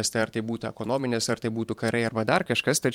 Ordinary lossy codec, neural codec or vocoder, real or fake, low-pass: MP3, 96 kbps; vocoder, 44.1 kHz, 128 mel bands every 512 samples, BigVGAN v2; fake; 19.8 kHz